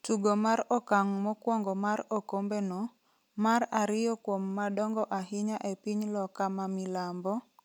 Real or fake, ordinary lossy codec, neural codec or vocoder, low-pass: real; none; none; 19.8 kHz